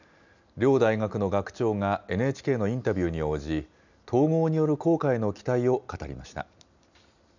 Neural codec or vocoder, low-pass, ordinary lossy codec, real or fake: none; 7.2 kHz; none; real